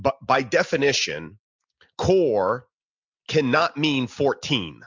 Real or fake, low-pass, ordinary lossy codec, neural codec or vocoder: real; 7.2 kHz; MP3, 64 kbps; none